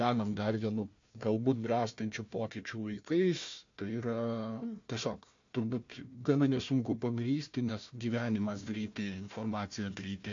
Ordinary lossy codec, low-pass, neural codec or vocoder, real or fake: MP3, 48 kbps; 7.2 kHz; codec, 16 kHz, 1 kbps, FunCodec, trained on Chinese and English, 50 frames a second; fake